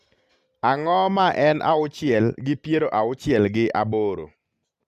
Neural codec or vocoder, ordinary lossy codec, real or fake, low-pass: none; Opus, 64 kbps; real; 14.4 kHz